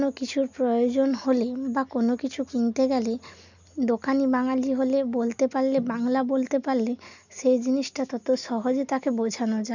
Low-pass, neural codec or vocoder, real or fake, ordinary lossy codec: 7.2 kHz; none; real; none